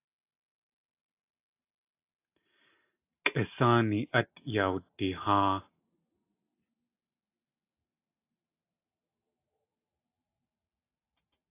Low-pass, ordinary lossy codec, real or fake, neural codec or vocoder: 3.6 kHz; AAC, 24 kbps; real; none